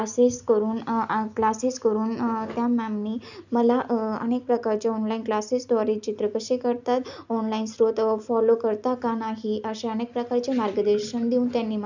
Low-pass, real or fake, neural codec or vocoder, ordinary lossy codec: 7.2 kHz; real; none; none